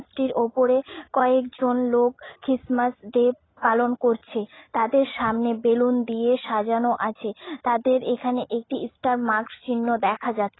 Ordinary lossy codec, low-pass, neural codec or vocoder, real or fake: AAC, 16 kbps; 7.2 kHz; none; real